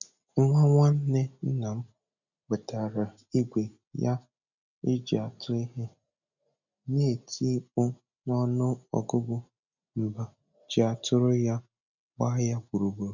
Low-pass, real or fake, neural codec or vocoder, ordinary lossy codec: 7.2 kHz; real; none; none